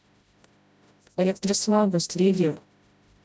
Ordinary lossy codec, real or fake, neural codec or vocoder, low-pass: none; fake; codec, 16 kHz, 0.5 kbps, FreqCodec, smaller model; none